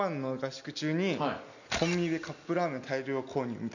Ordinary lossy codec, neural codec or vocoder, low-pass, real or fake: none; none; 7.2 kHz; real